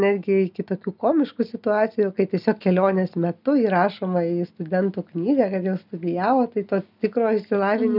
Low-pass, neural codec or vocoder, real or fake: 5.4 kHz; none; real